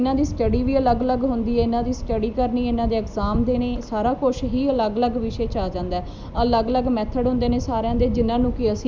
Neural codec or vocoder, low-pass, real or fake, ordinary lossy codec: none; none; real; none